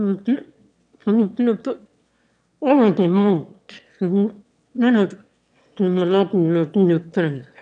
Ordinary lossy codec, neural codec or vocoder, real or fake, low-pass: none; autoencoder, 22.05 kHz, a latent of 192 numbers a frame, VITS, trained on one speaker; fake; 9.9 kHz